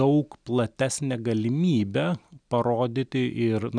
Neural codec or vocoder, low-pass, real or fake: none; 9.9 kHz; real